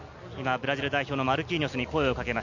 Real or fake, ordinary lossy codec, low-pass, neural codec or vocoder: real; none; 7.2 kHz; none